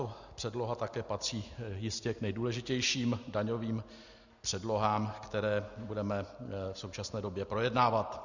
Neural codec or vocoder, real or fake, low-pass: none; real; 7.2 kHz